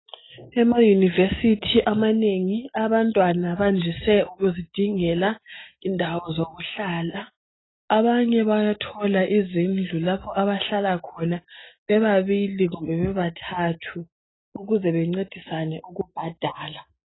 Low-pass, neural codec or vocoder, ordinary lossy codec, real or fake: 7.2 kHz; none; AAC, 16 kbps; real